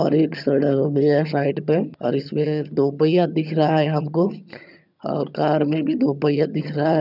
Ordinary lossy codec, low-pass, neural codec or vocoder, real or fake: none; 5.4 kHz; vocoder, 22.05 kHz, 80 mel bands, HiFi-GAN; fake